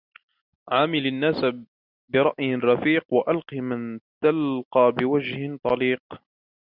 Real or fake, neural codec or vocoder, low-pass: real; none; 5.4 kHz